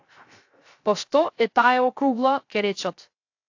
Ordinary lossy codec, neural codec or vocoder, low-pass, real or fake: AAC, 48 kbps; codec, 16 kHz, 0.3 kbps, FocalCodec; 7.2 kHz; fake